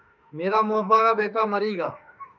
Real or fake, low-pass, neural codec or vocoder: fake; 7.2 kHz; autoencoder, 48 kHz, 32 numbers a frame, DAC-VAE, trained on Japanese speech